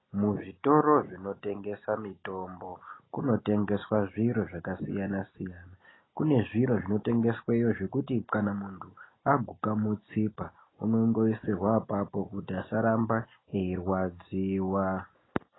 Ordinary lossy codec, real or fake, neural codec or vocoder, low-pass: AAC, 16 kbps; real; none; 7.2 kHz